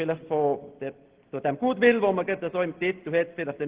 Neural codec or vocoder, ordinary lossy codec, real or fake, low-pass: none; Opus, 16 kbps; real; 3.6 kHz